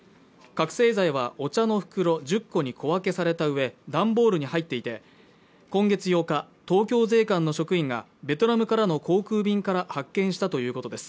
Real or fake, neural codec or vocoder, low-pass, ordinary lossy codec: real; none; none; none